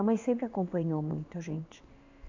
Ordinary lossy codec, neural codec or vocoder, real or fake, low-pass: MP3, 64 kbps; codec, 16 kHz in and 24 kHz out, 1 kbps, XY-Tokenizer; fake; 7.2 kHz